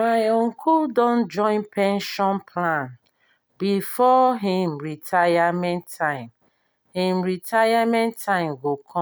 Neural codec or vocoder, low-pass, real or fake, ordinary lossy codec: none; none; real; none